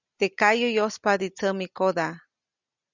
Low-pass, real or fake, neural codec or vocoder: 7.2 kHz; real; none